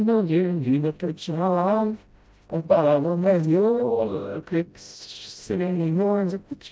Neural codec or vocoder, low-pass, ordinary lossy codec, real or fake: codec, 16 kHz, 0.5 kbps, FreqCodec, smaller model; none; none; fake